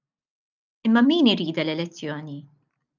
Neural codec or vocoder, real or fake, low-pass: none; real; 7.2 kHz